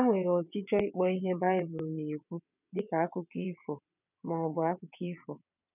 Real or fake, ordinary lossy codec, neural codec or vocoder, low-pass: fake; none; vocoder, 22.05 kHz, 80 mel bands, WaveNeXt; 3.6 kHz